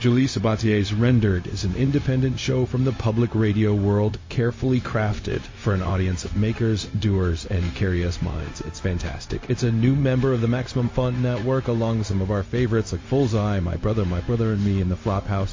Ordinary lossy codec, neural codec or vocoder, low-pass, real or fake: MP3, 32 kbps; codec, 16 kHz, 0.4 kbps, LongCat-Audio-Codec; 7.2 kHz; fake